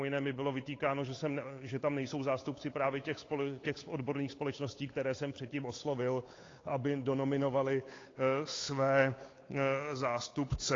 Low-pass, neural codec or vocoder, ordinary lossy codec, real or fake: 7.2 kHz; codec, 16 kHz, 8 kbps, FunCodec, trained on Chinese and English, 25 frames a second; AAC, 32 kbps; fake